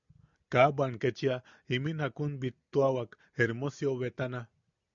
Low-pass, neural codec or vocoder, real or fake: 7.2 kHz; none; real